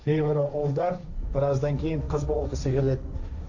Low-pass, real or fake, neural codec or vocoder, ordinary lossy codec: 7.2 kHz; fake; codec, 16 kHz, 1.1 kbps, Voila-Tokenizer; none